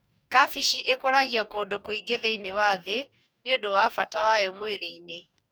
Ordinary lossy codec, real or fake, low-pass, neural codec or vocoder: none; fake; none; codec, 44.1 kHz, 2.6 kbps, DAC